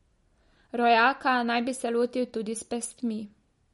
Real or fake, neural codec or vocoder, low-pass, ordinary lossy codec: real; none; 19.8 kHz; MP3, 48 kbps